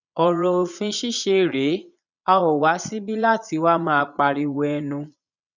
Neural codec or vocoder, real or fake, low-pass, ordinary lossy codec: vocoder, 22.05 kHz, 80 mel bands, Vocos; fake; 7.2 kHz; none